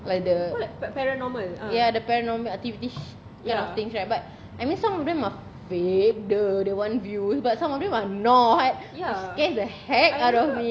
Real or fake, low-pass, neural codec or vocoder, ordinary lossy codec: real; none; none; none